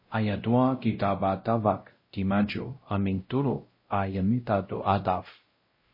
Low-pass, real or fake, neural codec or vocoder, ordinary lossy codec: 5.4 kHz; fake; codec, 16 kHz, 0.5 kbps, X-Codec, WavLM features, trained on Multilingual LibriSpeech; MP3, 24 kbps